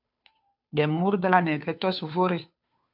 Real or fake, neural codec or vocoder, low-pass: fake; codec, 16 kHz, 2 kbps, FunCodec, trained on Chinese and English, 25 frames a second; 5.4 kHz